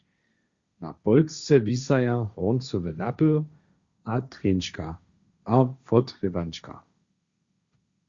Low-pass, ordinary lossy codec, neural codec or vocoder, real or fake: 7.2 kHz; Opus, 64 kbps; codec, 16 kHz, 1.1 kbps, Voila-Tokenizer; fake